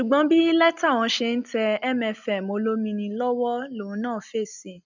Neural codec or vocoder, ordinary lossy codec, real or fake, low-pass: none; none; real; 7.2 kHz